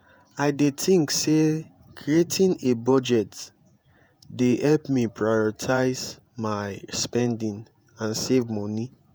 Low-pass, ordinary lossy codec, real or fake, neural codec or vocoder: none; none; fake; vocoder, 48 kHz, 128 mel bands, Vocos